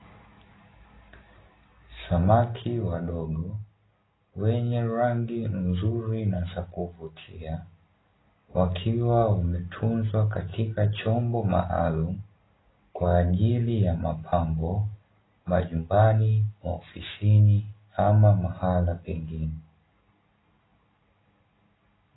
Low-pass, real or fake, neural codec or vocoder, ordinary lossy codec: 7.2 kHz; real; none; AAC, 16 kbps